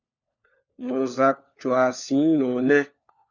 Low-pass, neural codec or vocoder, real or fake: 7.2 kHz; codec, 16 kHz, 4 kbps, FunCodec, trained on LibriTTS, 50 frames a second; fake